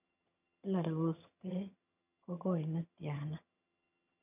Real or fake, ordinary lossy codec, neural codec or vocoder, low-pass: fake; none; vocoder, 22.05 kHz, 80 mel bands, HiFi-GAN; 3.6 kHz